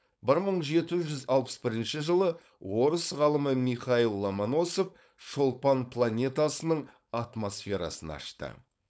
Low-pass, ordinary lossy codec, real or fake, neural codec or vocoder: none; none; fake; codec, 16 kHz, 4.8 kbps, FACodec